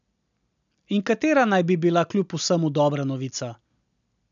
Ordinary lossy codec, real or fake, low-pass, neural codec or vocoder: none; real; 7.2 kHz; none